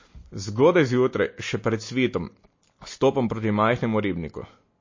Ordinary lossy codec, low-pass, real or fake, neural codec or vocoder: MP3, 32 kbps; 7.2 kHz; real; none